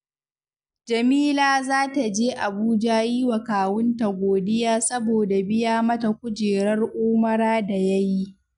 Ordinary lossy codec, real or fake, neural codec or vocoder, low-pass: none; real; none; 10.8 kHz